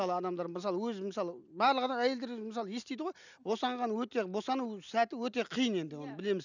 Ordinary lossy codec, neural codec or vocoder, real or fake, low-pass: none; none; real; 7.2 kHz